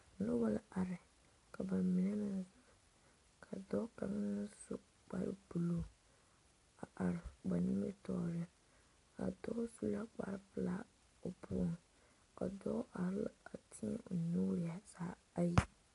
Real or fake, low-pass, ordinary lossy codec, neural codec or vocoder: real; 10.8 kHz; AAC, 48 kbps; none